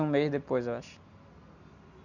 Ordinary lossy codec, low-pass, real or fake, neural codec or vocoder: none; 7.2 kHz; real; none